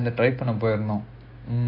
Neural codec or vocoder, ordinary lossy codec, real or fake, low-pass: none; AAC, 24 kbps; real; 5.4 kHz